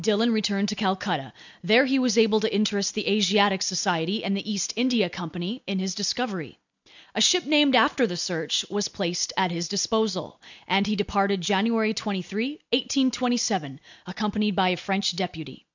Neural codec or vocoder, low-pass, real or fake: none; 7.2 kHz; real